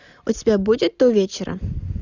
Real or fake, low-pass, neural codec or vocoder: real; 7.2 kHz; none